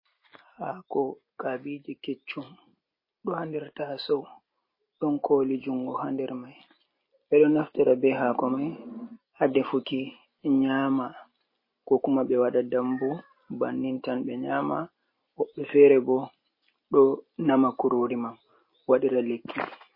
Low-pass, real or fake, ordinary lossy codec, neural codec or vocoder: 5.4 kHz; real; MP3, 24 kbps; none